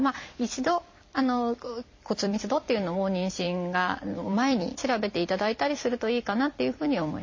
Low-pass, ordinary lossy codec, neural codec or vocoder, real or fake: 7.2 kHz; MP3, 32 kbps; vocoder, 44.1 kHz, 128 mel bands every 256 samples, BigVGAN v2; fake